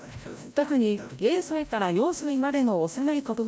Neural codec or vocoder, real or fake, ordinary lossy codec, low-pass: codec, 16 kHz, 0.5 kbps, FreqCodec, larger model; fake; none; none